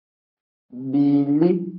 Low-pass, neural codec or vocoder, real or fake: 5.4 kHz; codec, 44.1 kHz, 7.8 kbps, Pupu-Codec; fake